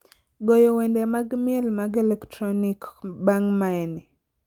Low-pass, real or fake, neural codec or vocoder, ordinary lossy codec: 19.8 kHz; real; none; Opus, 32 kbps